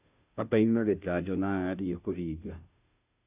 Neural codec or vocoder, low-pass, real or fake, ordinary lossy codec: codec, 16 kHz, 0.5 kbps, FunCodec, trained on Chinese and English, 25 frames a second; 3.6 kHz; fake; none